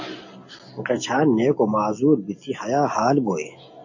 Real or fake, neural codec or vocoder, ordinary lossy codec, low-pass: real; none; AAC, 48 kbps; 7.2 kHz